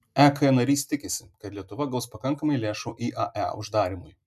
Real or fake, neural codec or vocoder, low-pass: real; none; 14.4 kHz